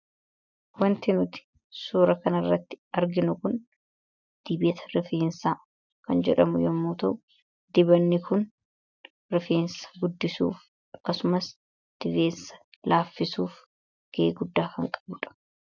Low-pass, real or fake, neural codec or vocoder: 7.2 kHz; real; none